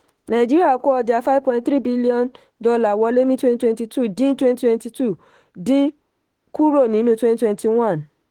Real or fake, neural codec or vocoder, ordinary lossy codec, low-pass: fake; autoencoder, 48 kHz, 32 numbers a frame, DAC-VAE, trained on Japanese speech; Opus, 16 kbps; 19.8 kHz